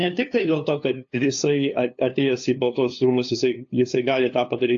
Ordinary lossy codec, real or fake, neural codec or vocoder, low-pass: AAC, 48 kbps; fake; codec, 16 kHz, 2 kbps, FunCodec, trained on LibriTTS, 25 frames a second; 7.2 kHz